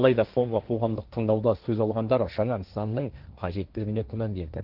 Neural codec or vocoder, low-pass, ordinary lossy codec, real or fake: codec, 16 kHz, 1.1 kbps, Voila-Tokenizer; 5.4 kHz; Opus, 32 kbps; fake